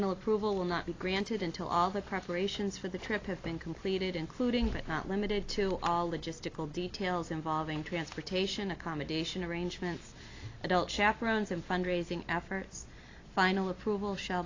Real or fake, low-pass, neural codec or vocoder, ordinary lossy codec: real; 7.2 kHz; none; AAC, 32 kbps